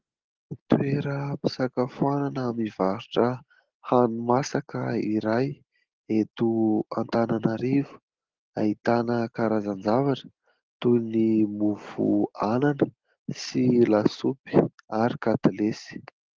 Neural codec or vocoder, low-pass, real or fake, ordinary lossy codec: none; 7.2 kHz; real; Opus, 16 kbps